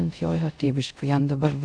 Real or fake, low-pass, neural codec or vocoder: fake; 9.9 kHz; codec, 24 kHz, 0.5 kbps, DualCodec